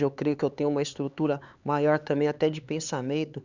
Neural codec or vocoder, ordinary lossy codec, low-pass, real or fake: codec, 16 kHz, 4 kbps, X-Codec, HuBERT features, trained on LibriSpeech; Opus, 64 kbps; 7.2 kHz; fake